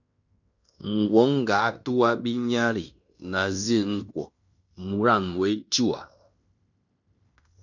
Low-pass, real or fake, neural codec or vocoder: 7.2 kHz; fake; codec, 16 kHz in and 24 kHz out, 0.9 kbps, LongCat-Audio-Codec, fine tuned four codebook decoder